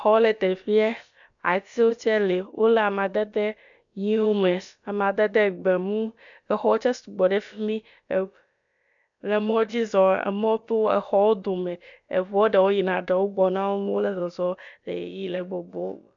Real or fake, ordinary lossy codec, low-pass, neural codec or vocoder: fake; MP3, 96 kbps; 7.2 kHz; codec, 16 kHz, about 1 kbps, DyCAST, with the encoder's durations